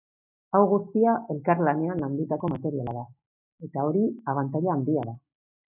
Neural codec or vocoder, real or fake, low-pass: none; real; 3.6 kHz